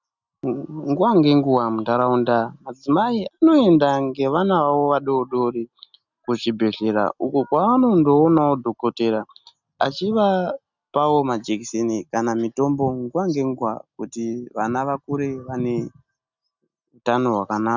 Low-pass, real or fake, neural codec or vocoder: 7.2 kHz; real; none